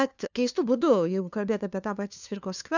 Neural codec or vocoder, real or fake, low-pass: codec, 16 kHz, 2 kbps, FunCodec, trained on LibriTTS, 25 frames a second; fake; 7.2 kHz